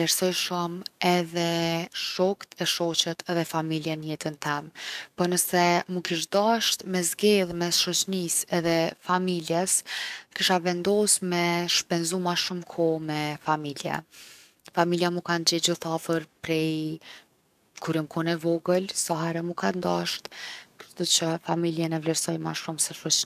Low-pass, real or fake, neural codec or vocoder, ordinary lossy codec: 14.4 kHz; fake; codec, 44.1 kHz, 7.8 kbps, DAC; none